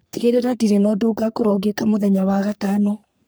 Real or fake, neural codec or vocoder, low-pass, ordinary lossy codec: fake; codec, 44.1 kHz, 3.4 kbps, Pupu-Codec; none; none